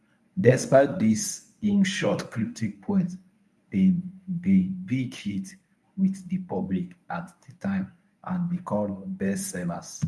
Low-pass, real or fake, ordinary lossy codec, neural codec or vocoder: none; fake; none; codec, 24 kHz, 0.9 kbps, WavTokenizer, medium speech release version 1